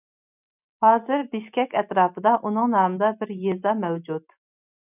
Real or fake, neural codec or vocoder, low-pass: real; none; 3.6 kHz